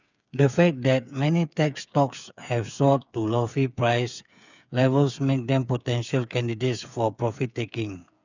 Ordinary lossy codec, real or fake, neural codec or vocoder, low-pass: none; fake; codec, 16 kHz, 8 kbps, FreqCodec, smaller model; 7.2 kHz